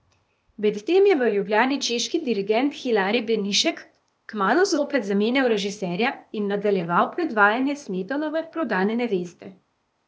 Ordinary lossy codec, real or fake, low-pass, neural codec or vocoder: none; fake; none; codec, 16 kHz, 0.8 kbps, ZipCodec